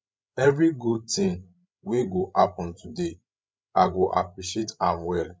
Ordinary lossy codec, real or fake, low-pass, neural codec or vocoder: none; fake; none; codec, 16 kHz, 16 kbps, FreqCodec, larger model